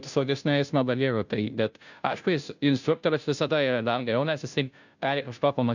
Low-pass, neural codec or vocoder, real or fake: 7.2 kHz; codec, 16 kHz, 0.5 kbps, FunCodec, trained on Chinese and English, 25 frames a second; fake